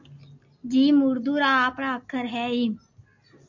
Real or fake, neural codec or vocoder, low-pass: real; none; 7.2 kHz